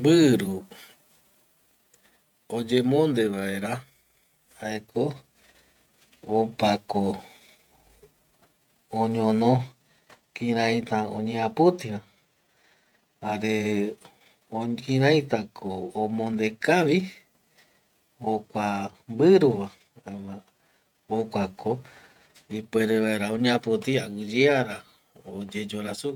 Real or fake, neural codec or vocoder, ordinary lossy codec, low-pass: fake; vocoder, 48 kHz, 128 mel bands, Vocos; none; 19.8 kHz